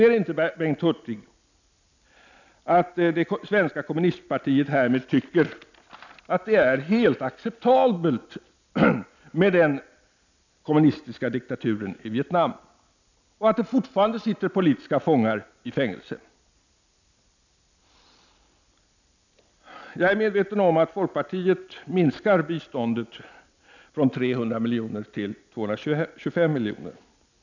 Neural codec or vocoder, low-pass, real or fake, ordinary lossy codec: none; 7.2 kHz; real; none